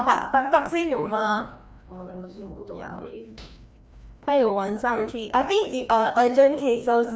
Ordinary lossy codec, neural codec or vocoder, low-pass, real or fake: none; codec, 16 kHz, 1 kbps, FreqCodec, larger model; none; fake